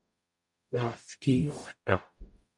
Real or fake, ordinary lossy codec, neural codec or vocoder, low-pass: fake; MP3, 96 kbps; codec, 44.1 kHz, 0.9 kbps, DAC; 10.8 kHz